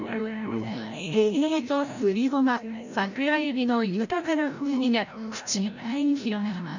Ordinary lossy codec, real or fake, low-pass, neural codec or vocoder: none; fake; 7.2 kHz; codec, 16 kHz, 0.5 kbps, FreqCodec, larger model